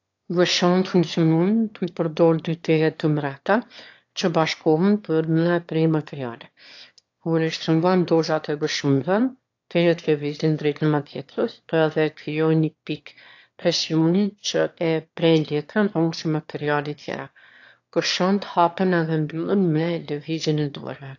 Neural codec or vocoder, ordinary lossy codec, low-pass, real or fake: autoencoder, 22.05 kHz, a latent of 192 numbers a frame, VITS, trained on one speaker; AAC, 48 kbps; 7.2 kHz; fake